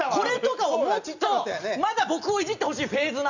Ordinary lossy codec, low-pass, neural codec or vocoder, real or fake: none; 7.2 kHz; none; real